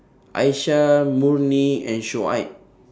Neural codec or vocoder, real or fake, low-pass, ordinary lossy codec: none; real; none; none